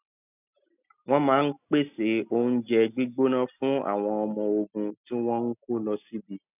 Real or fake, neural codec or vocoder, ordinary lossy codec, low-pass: real; none; none; 3.6 kHz